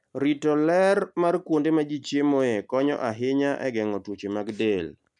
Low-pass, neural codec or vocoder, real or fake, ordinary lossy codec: 10.8 kHz; none; real; none